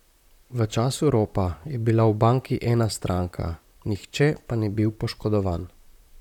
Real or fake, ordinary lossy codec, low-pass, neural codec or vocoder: fake; none; 19.8 kHz; vocoder, 44.1 kHz, 128 mel bands, Pupu-Vocoder